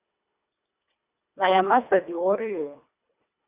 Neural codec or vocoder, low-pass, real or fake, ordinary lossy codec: codec, 24 kHz, 1.5 kbps, HILCodec; 3.6 kHz; fake; Opus, 64 kbps